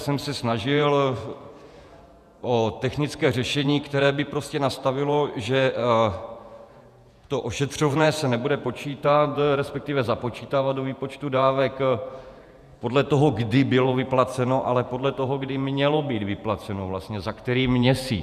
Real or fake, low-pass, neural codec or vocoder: fake; 14.4 kHz; vocoder, 48 kHz, 128 mel bands, Vocos